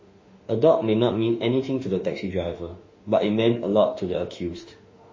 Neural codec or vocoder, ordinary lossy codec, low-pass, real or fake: autoencoder, 48 kHz, 32 numbers a frame, DAC-VAE, trained on Japanese speech; MP3, 32 kbps; 7.2 kHz; fake